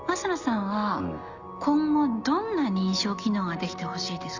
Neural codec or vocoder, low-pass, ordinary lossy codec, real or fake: none; 7.2 kHz; Opus, 64 kbps; real